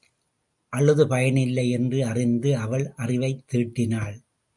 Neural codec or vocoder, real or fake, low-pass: none; real; 10.8 kHz